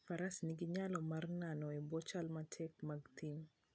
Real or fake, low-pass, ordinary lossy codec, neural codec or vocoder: real; none; none; none